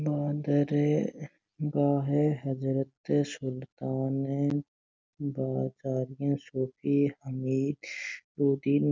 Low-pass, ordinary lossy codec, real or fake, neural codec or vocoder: none; none; real; none